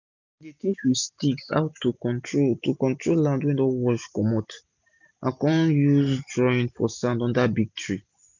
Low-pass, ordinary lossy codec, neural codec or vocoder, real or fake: 7.2 kHz; none; none; real